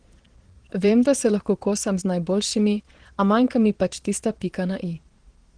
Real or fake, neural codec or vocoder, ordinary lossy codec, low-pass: fake; vocoder, 22.05 kHz, 80 mel bands, Vocos; Opus, 16 kbps; 9.9 kHz